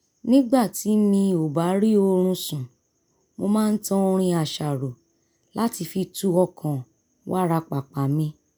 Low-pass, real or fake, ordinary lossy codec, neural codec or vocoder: none; real; none; none